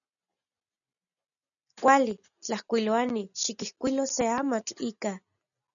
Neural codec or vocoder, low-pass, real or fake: none; 7.2 kHz; real